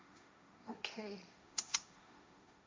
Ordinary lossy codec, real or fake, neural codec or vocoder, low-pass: none; fake; codec, 16 kHz, 1.1 kbps, Voila-Tokenizer; none